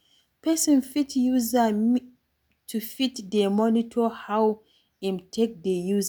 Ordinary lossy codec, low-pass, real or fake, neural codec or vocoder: none; none; real; none